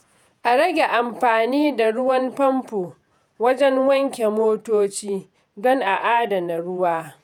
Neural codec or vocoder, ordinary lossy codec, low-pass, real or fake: vocoder, 48 kHz, 128 mel bands, Vocos; none; 19.8 kHz; fake